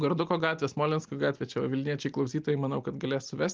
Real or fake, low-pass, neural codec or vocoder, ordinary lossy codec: real; 7.2 kHz; none; Opus, 32 kbps